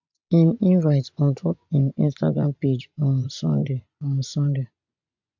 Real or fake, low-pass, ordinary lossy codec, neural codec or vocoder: fake; 7.2 kHz; MP3, 64 kbps; vocoder, 24 kHz, 100 mel bands, Vocos